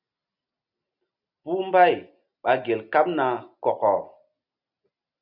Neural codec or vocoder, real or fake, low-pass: none; real; 5.4 kHz